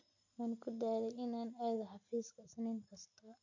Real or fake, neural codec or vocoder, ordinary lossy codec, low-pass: real; none; MP3, 48 kbps; 7.2 kHz